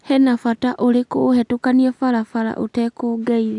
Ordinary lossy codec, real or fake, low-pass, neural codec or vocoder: none; real; 10.8 kHz; none